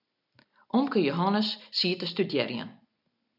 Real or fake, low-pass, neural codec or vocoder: real; 5.4 kHz; none